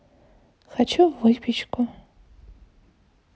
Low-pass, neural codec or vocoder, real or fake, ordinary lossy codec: none; none; real; none